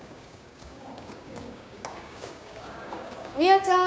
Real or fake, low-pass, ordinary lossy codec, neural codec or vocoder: fake; none; none; codec, 16 kHz, 6 kbps, DAC